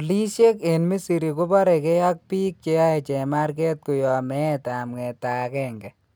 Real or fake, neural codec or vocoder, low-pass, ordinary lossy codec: real; none; none; none